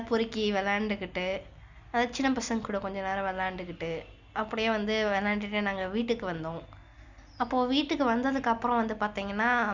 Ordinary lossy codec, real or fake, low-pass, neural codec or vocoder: Opus, 64 kbps; real; 7.2 kHz; none